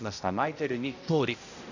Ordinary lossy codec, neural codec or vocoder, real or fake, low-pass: none; codec, 16 kHz, 0.5 kbps, X-Codec, HuBERT features, trained on balanced general audio; fake; 7.2 kHz